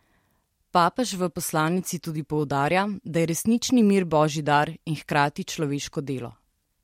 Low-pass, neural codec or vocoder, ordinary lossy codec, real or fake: 19.8 kHz; vocoder, 44.1 kHz, 128 mel bands every 256 samples, BigVGAN v2; MP3, 64 kbps; fake